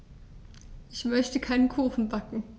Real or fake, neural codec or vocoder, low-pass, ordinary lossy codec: real; none; none; none